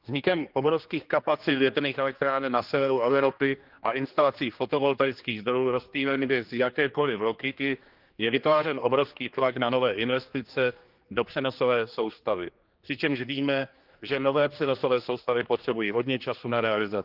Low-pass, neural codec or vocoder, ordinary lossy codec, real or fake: 5.4 kHz; codec, 16 kHz, 2 kbps, X-Codec, HuBERT features, trained on general audio; Opus, 24 kbps; fake